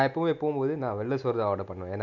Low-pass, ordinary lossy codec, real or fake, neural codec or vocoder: 7.2 kHz; none; real; none